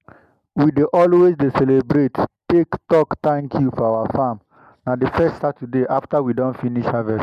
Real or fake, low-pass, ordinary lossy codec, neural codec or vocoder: real; 14.4 kHz; MP3, 96 kbps; none